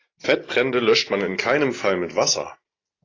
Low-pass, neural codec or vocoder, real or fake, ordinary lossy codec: 7.2 kHz; none; real; AAC, 32 kbps